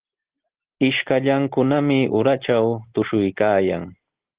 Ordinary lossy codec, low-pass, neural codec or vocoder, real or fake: Opus, 16 kbps; 3.6 kHz; none; real